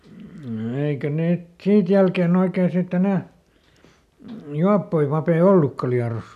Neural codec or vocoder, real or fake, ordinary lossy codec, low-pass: none; real; none; 14.4 kHz